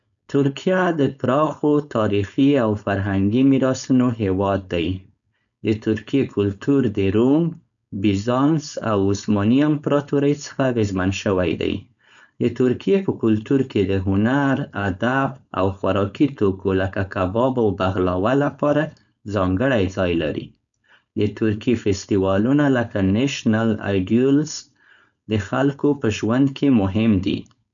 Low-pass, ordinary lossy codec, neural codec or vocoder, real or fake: 7.2 kHz; none; codec, 16 kHz, 4.8 kbps, FACodec; fake